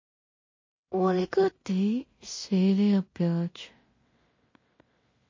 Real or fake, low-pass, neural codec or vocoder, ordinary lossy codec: fake; 7.2 kHz; codec, 16 kHz in and 24 kHz out, 0.4 kbps, LongCat-Audio-Codec, two codebook decoder; MP3, 32 kbps